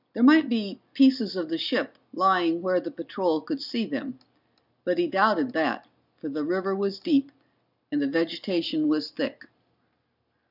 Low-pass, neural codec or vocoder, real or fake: 5.4 kHz; none; real